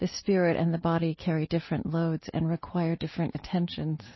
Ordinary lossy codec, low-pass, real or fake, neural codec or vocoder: MP3, 24 kbps; 7.2 kHz; real; none